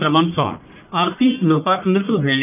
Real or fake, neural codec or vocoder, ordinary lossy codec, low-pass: fake; codec, 44.1 kHz, 1.7 kbps, Pupu-Codec; none; 3.6 kHz